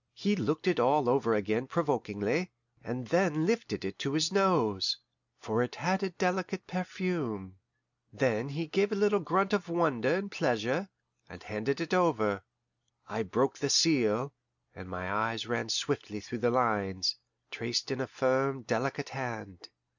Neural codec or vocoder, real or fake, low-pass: none; real; 7.2 kHz